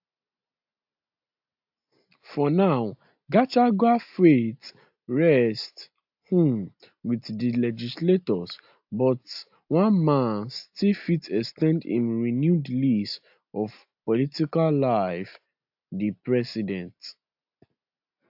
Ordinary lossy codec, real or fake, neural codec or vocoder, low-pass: AAC, 48 kbps; real; none; 5.4 kHz